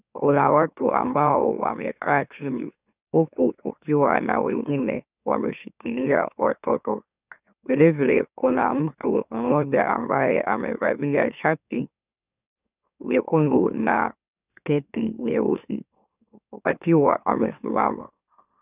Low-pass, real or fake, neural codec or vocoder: 3.6 kHz; fake; autoencoder, 44.1 kHz, a latent of 192 numbers a frame, MeloTTS